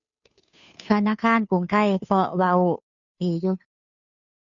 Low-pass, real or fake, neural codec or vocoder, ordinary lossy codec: 7.2 kHz; fake; codec, 16 kHz, 2 kbps, FunCodec, trained on Chinese and English, 25 frames a second; none